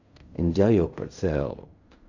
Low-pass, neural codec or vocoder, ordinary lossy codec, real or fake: 7.2 kHz; codec, 16 kHz in and 24 kHz out, 0.4 kbps, LongCat-Audio-Codec, fine tuned four codebook decoder; none; fake